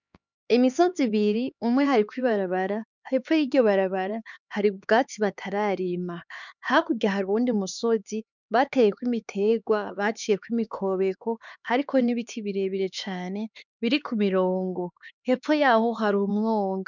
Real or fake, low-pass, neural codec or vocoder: fake; 7.2 kHz; codec, 16 kHz, 4 kbps, X-Codec, HuBERT features, trained on LibriSpeech